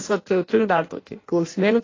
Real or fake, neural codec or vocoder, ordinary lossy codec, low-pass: fake; codec, 16 kHz in and 24 kHz out, 0.6 kbps, FireRedTTS-2 codec; AAC, 32 kbps; 7.2 kHz